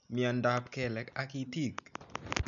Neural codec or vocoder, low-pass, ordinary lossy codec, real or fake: none; 7.2 kHz; none; real